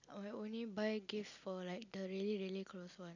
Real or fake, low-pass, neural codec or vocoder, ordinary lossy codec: real; 7.2 kHz; none; none